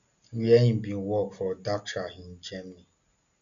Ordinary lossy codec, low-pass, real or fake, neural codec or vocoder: none; 7.2 kHz; real; none